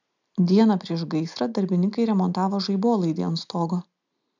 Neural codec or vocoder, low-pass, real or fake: none; 7.2 kHz; real